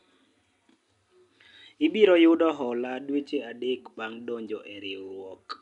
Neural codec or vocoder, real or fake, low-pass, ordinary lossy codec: none; real; 10.8 kHz; none